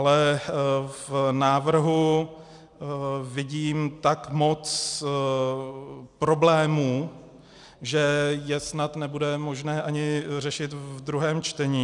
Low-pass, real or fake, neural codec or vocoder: 10.8 kHz; real; none